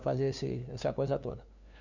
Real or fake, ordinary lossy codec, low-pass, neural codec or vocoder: fake; none; 7.2 kHz; codec, 16 kHz, 2 kbps, FunCodec, trained on LibriTTS, 25 frames a second